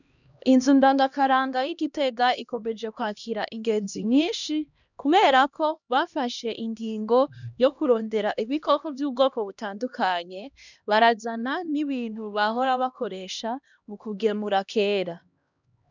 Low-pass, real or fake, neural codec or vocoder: 7.2 kHz; fake; codec, 16 kHz, 1 kbps, X-Codec, HuBERT features, trained on LibriSpeech